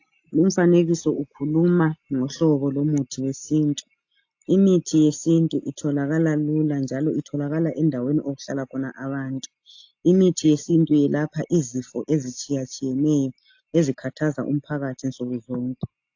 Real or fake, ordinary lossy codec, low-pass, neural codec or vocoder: real; AAC, 48 kbps; 7.2 kHz; none